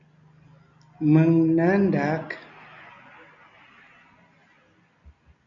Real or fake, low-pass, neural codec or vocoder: real; 7.2 kHz; none